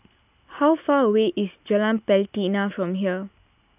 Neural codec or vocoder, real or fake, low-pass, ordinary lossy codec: none; real; 3.6 kHz; none